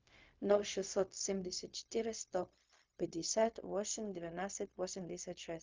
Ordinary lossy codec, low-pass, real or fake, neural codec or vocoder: Opus, 24 kbps; 7.2 kHz; fake; codec, 16 kHz, 0.4 kbps, LongCat-Audio-Codec